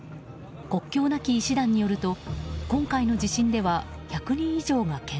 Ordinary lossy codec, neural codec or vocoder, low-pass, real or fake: none; none; none; real